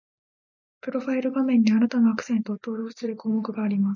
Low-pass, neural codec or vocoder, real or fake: 7.2 kHz; none; real